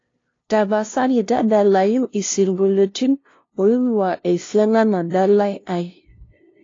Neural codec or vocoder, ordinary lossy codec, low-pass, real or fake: codec, 16 kHz, 0.5 kbps, FunCodec, trained on LibriTTS, 25 frames a second; AAC, 32 kbps; 7.2 kHz; fake